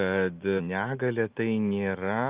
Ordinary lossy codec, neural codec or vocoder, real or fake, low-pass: Opus, 32 kbps; none; real; 3.6 kHz